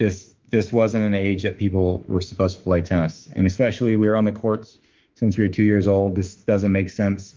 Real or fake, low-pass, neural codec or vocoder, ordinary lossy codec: fake; 7.2 kHz; autoencoder, 48 kHz, 32 numbers a frame, DAC-VAE, trained on Japanese speech; Opus, 24 kbps